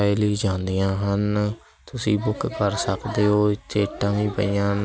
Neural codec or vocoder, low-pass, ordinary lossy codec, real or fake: none; none; none; real